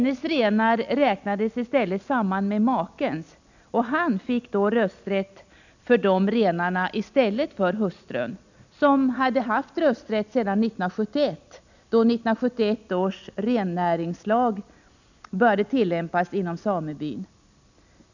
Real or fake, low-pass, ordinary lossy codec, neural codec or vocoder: real; 7.2 kHz; none; none